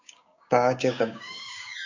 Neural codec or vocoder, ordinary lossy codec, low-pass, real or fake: codec, 16 kHz, 6 kbps, DAC; AAC, 48 kbps; 7.2 kHz; fake